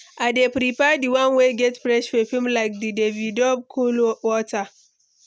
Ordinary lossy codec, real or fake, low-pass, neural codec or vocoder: none; real; none; none